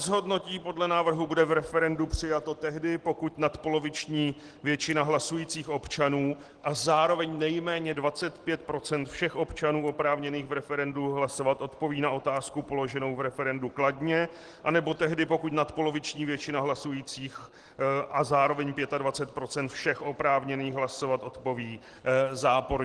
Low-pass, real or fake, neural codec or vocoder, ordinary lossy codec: 10.8 kHz; real; none; Opus, 16 kbps